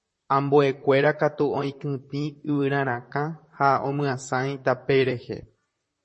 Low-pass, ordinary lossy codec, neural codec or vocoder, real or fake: 10.8 kHz; MP3, 32 kbps; vocoder, 44.1 kHz, 128 mel bands, Pupu-Vocoder; fake